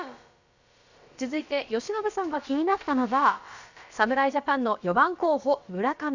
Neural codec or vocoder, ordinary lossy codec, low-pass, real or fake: codec, 16 kHz, about 1 kbps, DyCAST, with the encoder's durations; Opus, 64 kbps; 7.2 kHz; fake